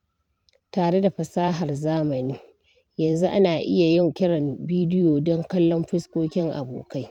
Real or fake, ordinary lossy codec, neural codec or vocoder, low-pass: fake; none; vocoder, 44.1 kHz, 128 mel bands every 512 samples, BigVGAN v2; 19.8 kHz